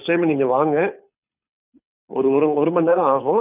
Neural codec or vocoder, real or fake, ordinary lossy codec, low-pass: codec, 24 kHz, 6 kbps, HILCodec; fake; none; 3.6 kHz